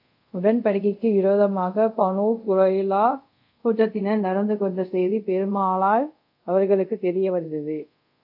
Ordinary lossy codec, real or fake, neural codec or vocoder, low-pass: AAC, 48 kbps; fake; codec, 24 kHz, 0.5 kbps, DualCodec; 5.4 kHz